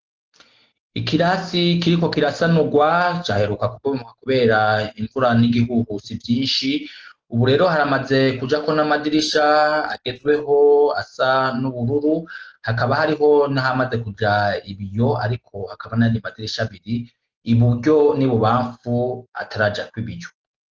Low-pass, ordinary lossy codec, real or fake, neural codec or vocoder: 7.2 kHz; Opus, 16 kbps; real; none